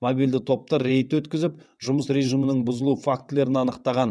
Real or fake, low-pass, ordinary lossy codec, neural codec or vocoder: fake; none; none; vocoder, 22.05 kHz, 80 mel bands, WaveNeXt